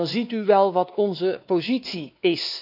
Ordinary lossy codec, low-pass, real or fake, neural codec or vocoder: none; 5.4 kHz; fake; codec, 16 kHz, 2 kbps, X-Codec, WavLM features, trained on Multilingual LibriSpeech